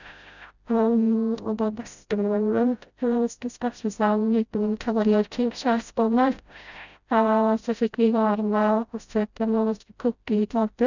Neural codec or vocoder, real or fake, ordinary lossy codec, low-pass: codec, 16 kHz, 0.5 kbps, FreqCodec, smaller model; fake; none; 7.2 kHz